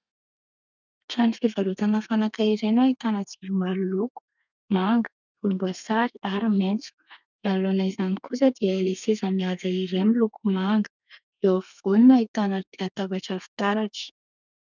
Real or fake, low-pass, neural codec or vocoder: fake; 7.2 kHz; codec, 32 kHz, 1.9 kbps, SNAC